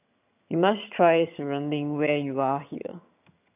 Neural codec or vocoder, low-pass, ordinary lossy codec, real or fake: vocoder, 22.05 kHz, 80 mel bands, HiFi-GAN; 3.6 kHz; AAC, 32 kbps; fake